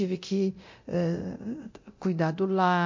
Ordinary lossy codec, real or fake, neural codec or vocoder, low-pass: MP3, 48 kbps; fake; codec, 24 kHz, 0.9 kbps, DualCodec; 7.2 kHz